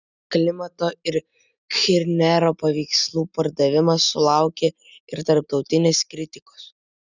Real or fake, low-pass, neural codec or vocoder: real; 7.2 kHz; none